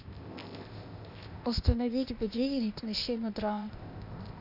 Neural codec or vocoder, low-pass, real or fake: codec, 16 kHz, 0.8 kbps, ZipCodec; 5.4 kHz; fake